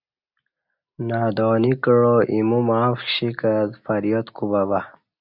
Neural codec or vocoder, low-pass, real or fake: none; 5.4 kHz; real